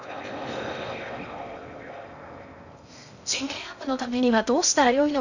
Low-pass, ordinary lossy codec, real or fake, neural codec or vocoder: 7.2 kHz; none; fake; codec, 16 kHz in and 24 kHz out, 0.6 kbps, FocalCodec, streaming, 4096 codes